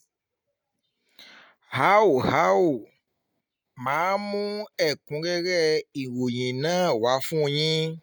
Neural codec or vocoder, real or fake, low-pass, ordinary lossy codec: none; real; none; none